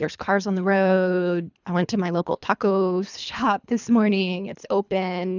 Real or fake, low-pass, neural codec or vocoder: fake; 7.2 kHz; codec, 24 kHz, 3 kbps, HILCodec